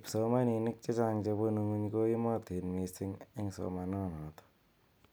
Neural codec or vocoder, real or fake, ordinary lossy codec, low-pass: none; real; none; none